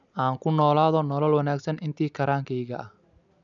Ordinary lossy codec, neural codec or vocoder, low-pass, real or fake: none; none; 7.2 kHz; real